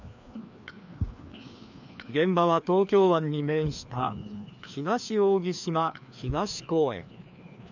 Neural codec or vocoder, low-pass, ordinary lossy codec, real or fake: codec, 16 kHz, 2 kbps, FreqCodec, larger model; 7.2 kHz; none; fake